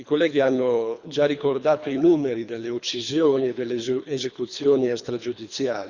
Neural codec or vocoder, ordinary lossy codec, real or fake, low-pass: codec, 24 kHz, 3 kbps, HILCodec; Opus, 64 kbps; fake; 7.2 kHz